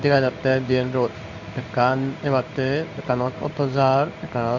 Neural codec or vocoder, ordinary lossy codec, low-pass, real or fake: codec, 16 kHz in and 24 kHz out, 1 kbps, XY-Tokenizer; none; 7.2 kHz; fake